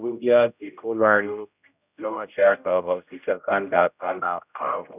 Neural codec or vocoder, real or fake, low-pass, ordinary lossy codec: codec, 16 kHz, 0.5 kbps, X-Codec, HuBERT features, trained on general audio; fake; 3.6 kHz; none